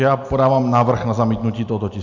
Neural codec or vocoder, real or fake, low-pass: none; real; 7.2 kHz